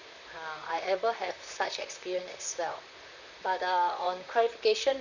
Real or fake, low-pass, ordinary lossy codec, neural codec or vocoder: fake; 7.2 kHz; none; vocoder, 44.1 kHz, 128 mel bands, Pupu-Vocoder